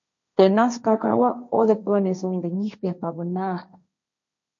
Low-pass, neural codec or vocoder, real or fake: 7.2 kHz; codec, 16 kHz, 1.1 kbps, Voila-Tokenizer; fake